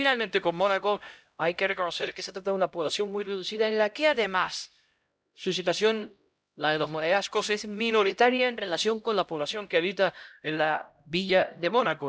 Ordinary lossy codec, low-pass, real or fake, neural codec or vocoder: none; none; fake; codec, 16 kHz, 0.5 kbps, X-Codec, HuBERT features, trained on LibriSpeech